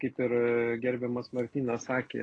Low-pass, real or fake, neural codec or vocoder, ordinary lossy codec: 9.9 kHz; real; none; AAC, 32 kbps